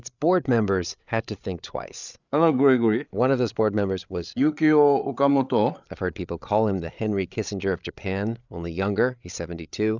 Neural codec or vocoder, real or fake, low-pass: codec, 16 kHz, 8 kbps, FreqCodec, larger model; fake; 7.2 kHz